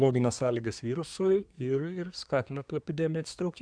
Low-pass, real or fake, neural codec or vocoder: 9.9 kHz; fake; codec, 24 kHz, 1 kbps, SNAC